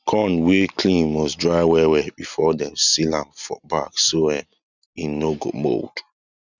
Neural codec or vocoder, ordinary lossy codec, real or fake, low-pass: none; none; real; 7.2 kHz